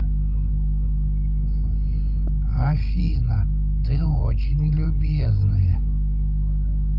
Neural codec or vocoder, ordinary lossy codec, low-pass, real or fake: codec, 16 kHz, 16 kbps, FreqCodec, larger model; Opus, 24 kbps; 5.4 kHz; fake